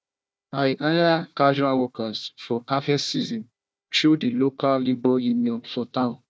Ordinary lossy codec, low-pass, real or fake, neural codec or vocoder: none; none; fake; codec, 16 kHz, 1 kbps, FunCodec, trained on Chinese and English, 50 frames a second